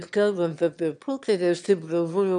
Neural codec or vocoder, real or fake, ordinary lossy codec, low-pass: autoencoder, 22.05 kHz, a latent of 192 numbers a frame, VITS, trained on one speaker; fake; MP3, 96 kbps; 9.9 kHz